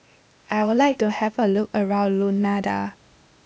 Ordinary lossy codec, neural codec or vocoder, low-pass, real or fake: none; codec, 16 kHz, 0.8 kbps, ZipCodec; none; fake